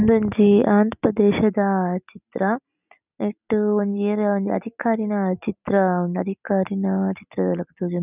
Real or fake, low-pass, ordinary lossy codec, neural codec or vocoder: real; 3.6 kHz; none; none